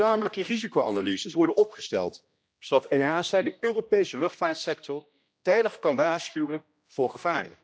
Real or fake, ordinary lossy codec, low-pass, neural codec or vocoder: fake; none; none; codec, 16 kHz, 1 kbps, X-Codec, HuBERT features, trained on general audio